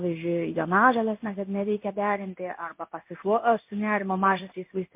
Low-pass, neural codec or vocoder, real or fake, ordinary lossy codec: 3.6 kHz; codec, 16 kHz in and 24 kHz out, 1 kbps, XY-Tokenizer; fake; MP3, 32 kbps